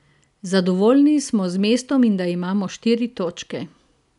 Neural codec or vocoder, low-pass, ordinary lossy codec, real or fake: none; 10.8 kHz; none; real